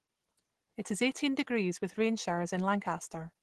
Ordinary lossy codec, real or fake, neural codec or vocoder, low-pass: Opus, 16 kbps; real; none; 10.8 kHz